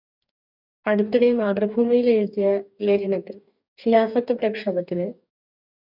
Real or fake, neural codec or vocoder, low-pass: fake; codec, 44.1 kHz, 2.6 kbps, DAC; 5.4 kHz